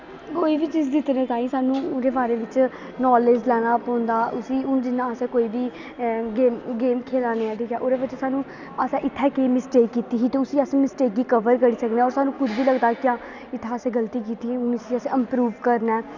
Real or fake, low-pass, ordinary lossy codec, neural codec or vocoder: real; 7.2 kHz; none; none